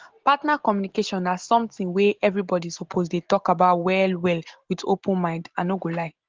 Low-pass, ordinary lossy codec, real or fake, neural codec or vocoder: 7.2 kHz; Opus, 16 kbps; real; none